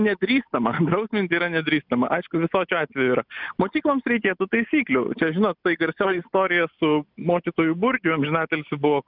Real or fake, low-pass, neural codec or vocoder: real; 5.4 kHz; none